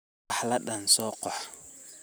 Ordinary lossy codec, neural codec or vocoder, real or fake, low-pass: none; none; real; none